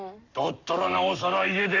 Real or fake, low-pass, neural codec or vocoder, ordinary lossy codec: fake; 7.2 kHz; codec, 44.1 kHz, 7.8 kbps, Pupu-Codec; none